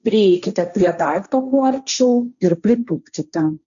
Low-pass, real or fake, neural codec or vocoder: 7.2 kHz; fake; codec, 16 kHz, 1.1 kbps, Voila-Tokenizer